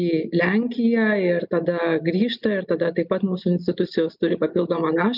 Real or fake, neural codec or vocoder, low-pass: real; none; 5.4 kHz